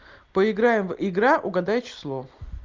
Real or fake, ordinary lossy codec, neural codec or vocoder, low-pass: real; Opus, 24 kbps; none; 7.2 kHz